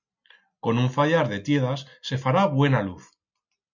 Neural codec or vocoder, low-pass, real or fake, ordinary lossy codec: none; 7.2 kHz; real; MP3, 64 kbps